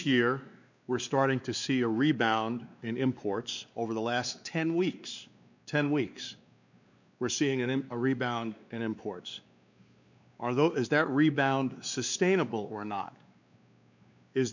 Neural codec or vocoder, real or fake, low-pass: codec, 16 kHz, 2 kbps, X-Codec, WavLM features, trained on Multilingual LibriSpeech; fake; 7.2 kHz